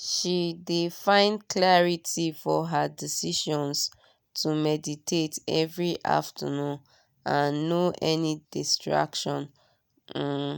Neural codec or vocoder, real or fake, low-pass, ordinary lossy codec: none; real; none; none